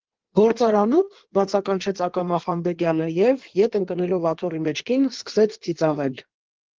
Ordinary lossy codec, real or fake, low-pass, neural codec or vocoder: Opus, 16 kbps; fake; 7.2 kHz; codec, 16 kHz in and 24 kHz out, 1.1 kbps, FireRedTTS-2 codec